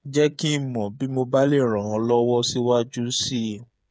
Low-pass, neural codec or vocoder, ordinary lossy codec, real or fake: none; codec, 16 kHz, 8 kbps, FreqCodec, smaller model; none; fake